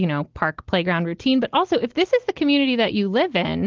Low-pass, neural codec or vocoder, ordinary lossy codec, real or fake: 7.2 kHz; none; Opus, 16 kbps; real